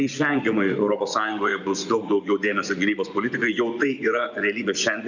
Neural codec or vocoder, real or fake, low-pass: vocoder, 24 kHz, 100 mel bands, Vocos; fake; 7.2 kHz